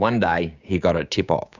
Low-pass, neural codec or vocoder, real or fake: 7.2 kHz; codec, 16 kHz, 6 kbps, DAC; fake